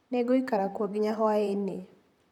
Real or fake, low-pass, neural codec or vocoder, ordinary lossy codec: fake; 19.8 kHz; vocoder, 44.1 kHz, 128 mel bands, Pupu-Vocoder; none